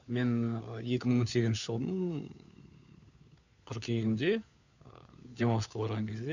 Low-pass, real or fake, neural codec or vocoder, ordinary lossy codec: 7.2 kHz; fake; codec, 16 kHz, 4 kbps, FunCodec, trained on LibriTTS, 50 frames a second; none